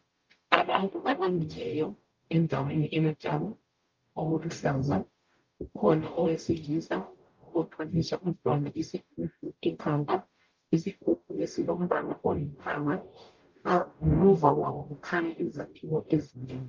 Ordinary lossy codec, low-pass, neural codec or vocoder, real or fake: Opus, 24 kbps; 7.2 kHz; codec, 44.1 kHz, 0.9 kbps, DAC; fake